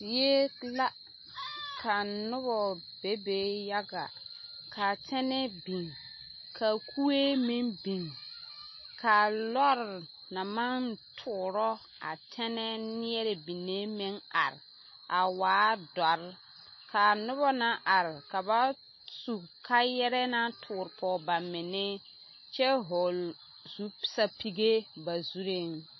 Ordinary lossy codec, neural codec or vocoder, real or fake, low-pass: MP3, 24 kbps; none; real; 7.2 kHz